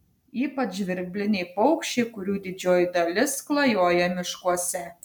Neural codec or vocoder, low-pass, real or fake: none; 19.8 kHz; real